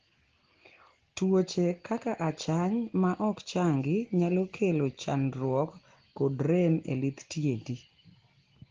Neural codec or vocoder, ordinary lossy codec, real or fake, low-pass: none; Opus, 16 kbps; real; 7.2 kHz